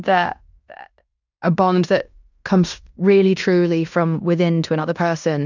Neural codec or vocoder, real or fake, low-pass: codec, 16 kHz in and 24 kHz out, 0.9 kbps, LongCat-Audio-Codec, fine tuned four codebook decoder; fake; 7.2 kHz